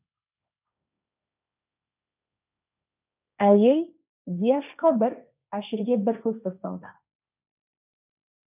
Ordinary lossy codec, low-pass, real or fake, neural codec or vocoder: none; 3.6 kHz; fake; codec, 16 kHz, 1.1 kbps, Voila-Tokenizer